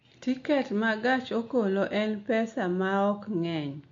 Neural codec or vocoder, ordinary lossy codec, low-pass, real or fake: none; MP3, 48 kbps; 7.2 kHz; real